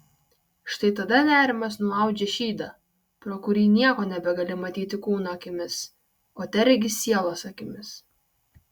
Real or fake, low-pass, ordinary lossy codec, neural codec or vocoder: real; 19.8 kHz; Opus, 64 kbps; none